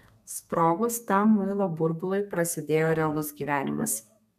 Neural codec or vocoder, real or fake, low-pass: codec, 32 kHz, 1.9 kbps, SNAC; fake; 14.4 kHz